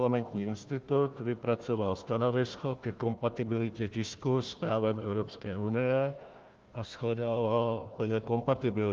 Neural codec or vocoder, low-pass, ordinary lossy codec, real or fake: codec, 16 kHz, 1 kbps, FunCodec, trained on Chinese and English, 50 frames a second; 7.2 kHz; Opus, 32 kbps; fake